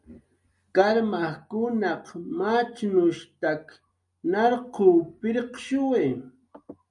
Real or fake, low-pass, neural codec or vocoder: real; 10.8 kHz; none